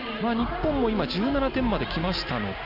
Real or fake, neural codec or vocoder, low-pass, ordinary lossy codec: real; none; 5.4 kHz; none